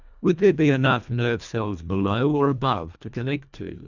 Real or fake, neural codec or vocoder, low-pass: fake; codec, 24 kHz, 1.5 kbps, HILCodec; 7.2 kHz